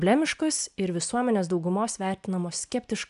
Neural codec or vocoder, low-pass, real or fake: none; 10.8 kHz; real